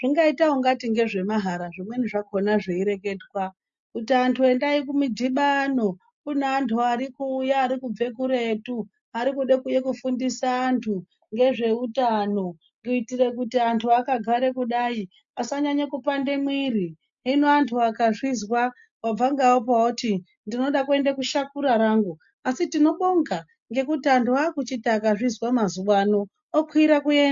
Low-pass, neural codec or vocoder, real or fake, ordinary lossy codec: 7.2 kHz; none; real; MP3, 48 kbps